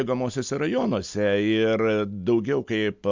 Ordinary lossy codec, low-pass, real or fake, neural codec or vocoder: MP3, 64 kbps; 7.2 kHz; real; none